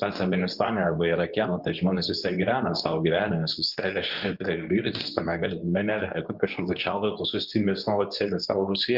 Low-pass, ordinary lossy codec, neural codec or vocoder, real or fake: 5.4 kHz; Opus, 32 kbps; codec, 24 kHz, 0.9 kbps, WavTokenizer, medium speech release version 2; fake